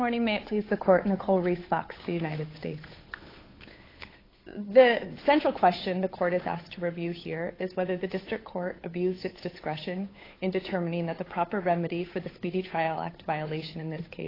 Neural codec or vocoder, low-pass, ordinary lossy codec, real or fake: codec, 16 kHz, 8 kbps, FunCodec, trained on Chinese and English, 25 frames a second; 5.4 kHz; AAC, 24 kbps; fake